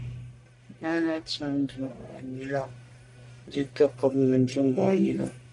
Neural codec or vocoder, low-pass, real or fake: codec, 44.1 kHz, 1.7 kbps, Pupu-Codec; 10.8 kHz; fake